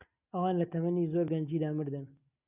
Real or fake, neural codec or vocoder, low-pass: real; none; 3.6 kHz